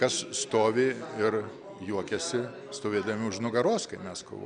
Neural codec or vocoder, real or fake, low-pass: none; real; 9.9 kHz